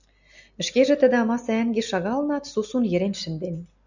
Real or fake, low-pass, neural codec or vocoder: real; 7.2 kHz; none